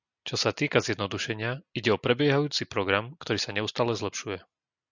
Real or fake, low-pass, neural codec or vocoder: real; 7.2 kHz; none